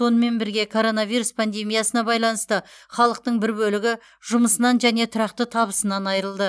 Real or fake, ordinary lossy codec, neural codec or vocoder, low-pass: real; none; none; none